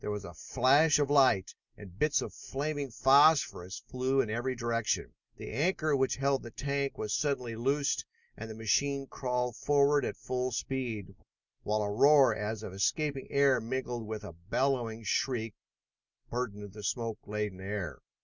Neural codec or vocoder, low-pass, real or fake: none; 7.2 kHz; real